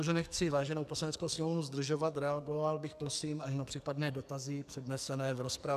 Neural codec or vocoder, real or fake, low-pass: codec, 44.1 kHz, 2.6 kbps, SNAC; fake; 14.4 kHz